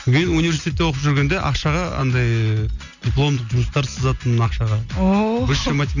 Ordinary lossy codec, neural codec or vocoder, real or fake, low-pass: none; none; real; 7.2 kHz